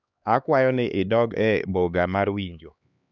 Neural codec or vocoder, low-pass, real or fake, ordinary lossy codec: codec, 16 kHz, 2 kbps, X-Codec, HuBERT features, trained on LibriSpeech; 7.2 kHz; fake; none